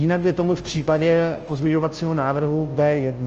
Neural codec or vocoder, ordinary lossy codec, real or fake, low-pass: codec, 16 kHz, 0.5 kbps, FunCodec, trained on Chinese and English, 25 frames a second; Opus, 24 kbps; fake; 7.2 kHz